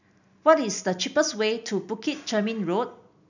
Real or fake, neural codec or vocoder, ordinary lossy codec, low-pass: real; none; none; 7.2 kHz